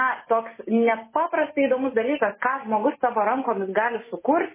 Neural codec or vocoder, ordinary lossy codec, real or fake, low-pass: none; MP3, 16 kbps; real; 3.6 kHz